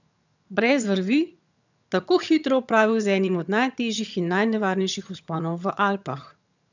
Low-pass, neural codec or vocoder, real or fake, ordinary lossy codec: 7.2 kHz; vocoder, 22.05 kHz, 80 mel bands, HiFi-GAN; fake; none